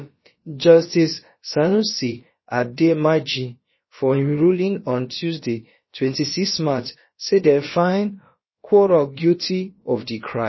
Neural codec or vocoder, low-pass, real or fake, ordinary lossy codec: codec, 16 kHz, about 1 kbps, DyCAST, with the encoder's durations; 7.2 kHz; fake; MP3, 24 kbps